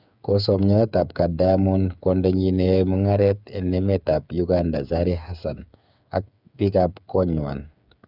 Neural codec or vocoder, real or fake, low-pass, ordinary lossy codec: codec, 16 kHz, 8 kbps, FreqCodec, smaller model; fake; 5.4 kHz; none